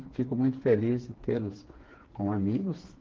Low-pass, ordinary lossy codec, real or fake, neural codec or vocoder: 7.2 kHz; Opus, 16 kbps; fake; codec, 16 kHz, 4 kbps, FreqCodec, smaller model